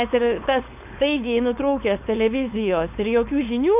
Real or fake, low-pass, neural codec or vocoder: fake; 3.6 kHz; codec, 16 kHz, 4.8 kbps, FACodec